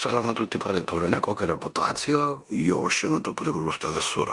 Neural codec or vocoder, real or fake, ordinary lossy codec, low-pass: codec, 16 kHz in and 24 kHz out, 0.9 kbps, LongCat-Audio-Codec, four codebook decoder; fake; Opus, 64 kbps; 10.8 kHz